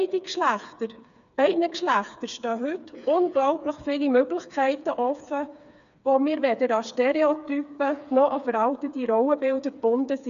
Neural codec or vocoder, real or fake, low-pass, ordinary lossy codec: codec, 16 kHz, 4 kbps, FreqCodec, smaller model; fake; 7.2 kHz; none